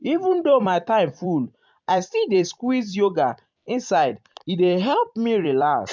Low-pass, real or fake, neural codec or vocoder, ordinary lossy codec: 7.2 kHz; fake; vocoder, 44.1 kHz, 128 mel bands every 256 samples, BigVGAN v2; MP3, 64 kbps